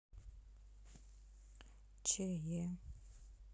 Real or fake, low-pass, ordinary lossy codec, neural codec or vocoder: real; none; none; none